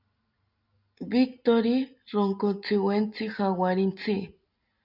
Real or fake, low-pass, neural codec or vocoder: real; 5.4 kHz; none